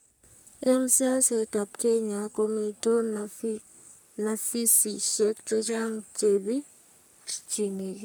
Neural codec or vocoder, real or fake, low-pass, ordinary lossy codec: codec, 44.1 kHz, 3.4 kbps, Pupu-Codec; fake; none; none